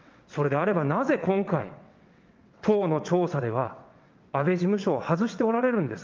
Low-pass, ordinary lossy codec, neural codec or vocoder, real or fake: 7.2 kHz; Opus, 24 kbps; vocoder, 22.05 kHz, 80 mel bands, WaveNeXt; fake